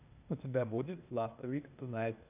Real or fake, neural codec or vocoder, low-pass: fake; codec, 16 kHz, 0.8 kbps, ZipCodec; 3.6 kHz